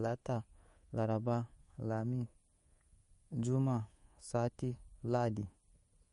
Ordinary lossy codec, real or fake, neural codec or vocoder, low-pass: MP3, 48 kbps; fake; codec, 24 kHz, 3.1 kbps, DualCodec; 10.8 kHz